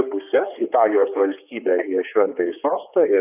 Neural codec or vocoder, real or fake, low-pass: codec, 16 kHz, 4 kbps, X-Codec, HuBERT features, trained on general audio; fake; 3.6 kHz